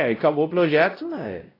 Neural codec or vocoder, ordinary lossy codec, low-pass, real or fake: codec, 16 kHz, 0.5 kbps, X-Codec, WavLM features, trained on Multilingual LibriSpeech; AAC, 24 kbps; 5.4 kHz; fake